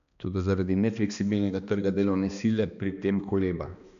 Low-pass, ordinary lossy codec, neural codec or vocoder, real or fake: 7.2 kHz; none; codec, 16 kHz, 2 kbps, X-Codec, HuBERT features, trained on balanced general audio; fake